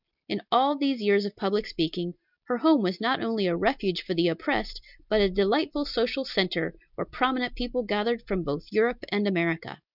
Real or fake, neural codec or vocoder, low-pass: real; none; 5.4 kHz